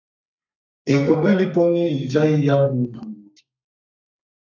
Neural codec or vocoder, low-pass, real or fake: codec, 32 kHz, 1.9 kbps, SNAC; 7.2 kHz; fake